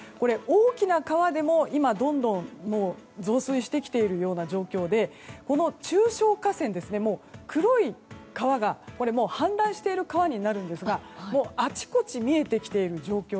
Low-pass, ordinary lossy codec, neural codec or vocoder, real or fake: none; none; none; real